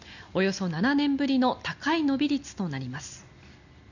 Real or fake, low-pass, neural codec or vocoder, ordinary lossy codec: real; 7.2 kHz; none; none